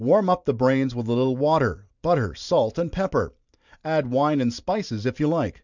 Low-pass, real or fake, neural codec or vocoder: 7.2 kHz; fake; vocoder, 44.1 kHz, 128 mel bands every 512 samples, BigVGAN v2